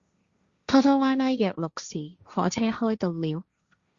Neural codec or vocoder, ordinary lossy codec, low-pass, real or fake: codec, 16 kHz, 1.1 kbps, Voila-Tokenizer; Opus, 64 kbps; 7.2 kHz; fake